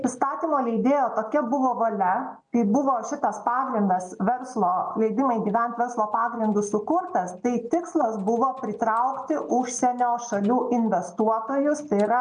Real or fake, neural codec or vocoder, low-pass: real; none; 9.9 kHz